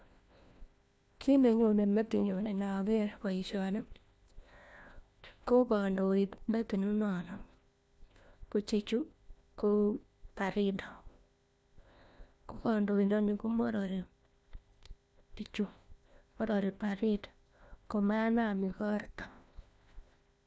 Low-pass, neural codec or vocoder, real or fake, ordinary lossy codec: none; codec, 16 kHz, 1 kbps, FunCodec, trained on LibriTTS, 50 frames a second; fake; none